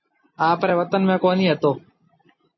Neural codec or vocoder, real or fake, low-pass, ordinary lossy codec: none; real; 7.2 kHz; MP3, 24 kbps